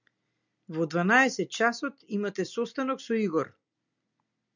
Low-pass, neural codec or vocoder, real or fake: 7.2 kHz; none; real